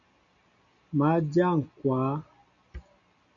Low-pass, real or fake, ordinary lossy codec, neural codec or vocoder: 7.2 kHz; real; MP3, 96 kbps; none